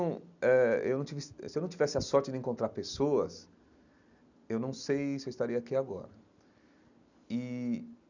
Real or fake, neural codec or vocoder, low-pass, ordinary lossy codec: real; none; 7.2 kHz; none